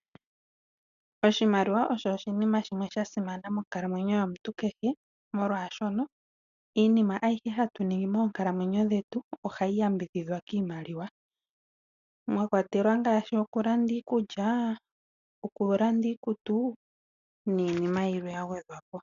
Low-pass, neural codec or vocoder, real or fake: 7.2 kHz; none; real